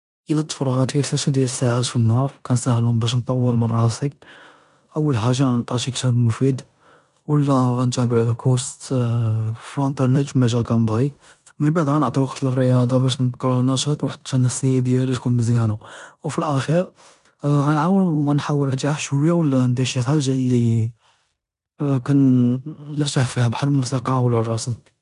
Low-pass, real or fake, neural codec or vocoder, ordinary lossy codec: 10.8 kHz; fake; codec, 16 kHz in and 24 kHz out, 0.9 kbps, LongCat-Audio-Codec, four codebook decoder; none